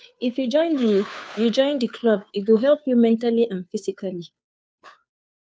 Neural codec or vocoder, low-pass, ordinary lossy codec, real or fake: codec, 16 kHz, 2 kbps, FunCodec, trained on Chinese and English, 25 frames a second; none; none; fake